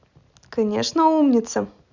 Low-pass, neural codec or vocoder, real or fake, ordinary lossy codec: 7.2 kHz; none; real; none